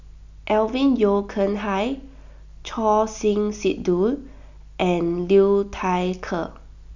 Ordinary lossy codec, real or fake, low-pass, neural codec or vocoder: none; real; 7.2 kHz; none